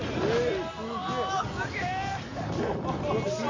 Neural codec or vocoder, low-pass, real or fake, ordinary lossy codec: none; 7.2 kHz; real; MP3, 48 kbps